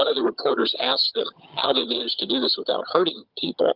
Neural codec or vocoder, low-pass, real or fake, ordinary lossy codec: vocoder, 22.05 kHz, 80 mel bands, HiFi-GAN; 5.4 kHz; fake; Opus, 32 kbps